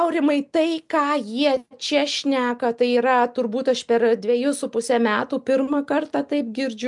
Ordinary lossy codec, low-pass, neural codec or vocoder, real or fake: MP3, 96 kbps; 10.8 kHz; none; real